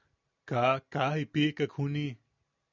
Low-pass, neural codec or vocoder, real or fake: 7.2 kHz; none; real